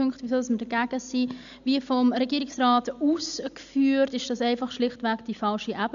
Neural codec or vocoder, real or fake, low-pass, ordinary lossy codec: none; real; 7.2 kHz; AAC, 96 kbps